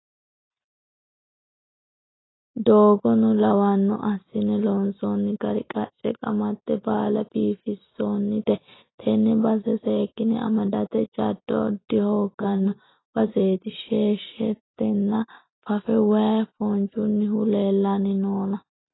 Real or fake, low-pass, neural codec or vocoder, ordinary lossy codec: real; 7.2 kHz; none; AAC, 16 kbps